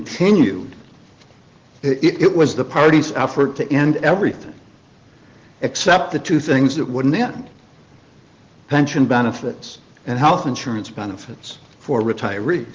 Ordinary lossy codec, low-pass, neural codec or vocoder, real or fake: Opus, 16 kbps; 7.2 kHz; none; real